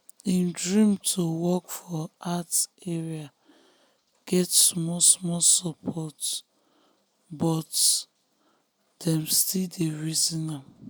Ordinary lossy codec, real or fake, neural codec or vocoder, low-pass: none; real; none; none